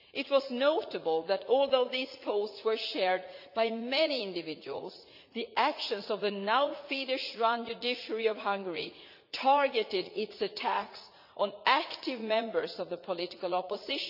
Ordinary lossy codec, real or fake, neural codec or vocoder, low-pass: none; fake; vocoder, 44.1 kHz, 80 mel bands, Vocos; 5.4 kHz